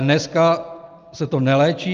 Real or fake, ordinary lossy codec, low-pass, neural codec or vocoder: real; Opus, 32 kbps; 7.2 kHz; none